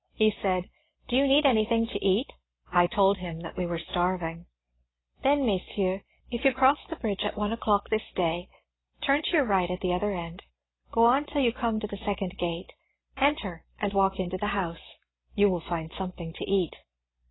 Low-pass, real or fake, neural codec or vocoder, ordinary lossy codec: 7.2 kHz; real; none; AAC, 16 kbps